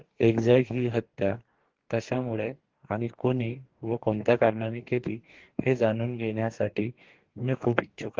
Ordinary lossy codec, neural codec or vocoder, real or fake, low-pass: Opus, 16 kbps; codec, 44.1 kHz, 2.6 kbps, DAC; fake; 7.2 kHz